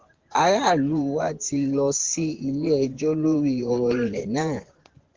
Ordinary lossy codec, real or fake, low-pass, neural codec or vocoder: Opus, 16 kbps; fake; 7.2 kHz; vocoder, 24 kHz, 100 mel bands, Vocos